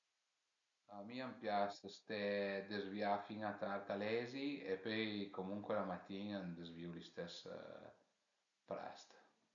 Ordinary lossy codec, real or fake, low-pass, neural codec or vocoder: none; real; 7.2 kHz; none